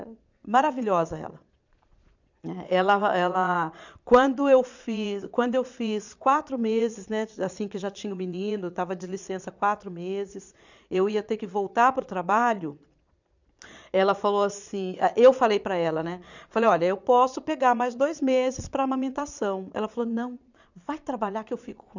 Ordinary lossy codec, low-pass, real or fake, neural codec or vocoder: none; 7.2 kHz; fake; vocoder, 22.05 kHz, 80 mel bands, Vocos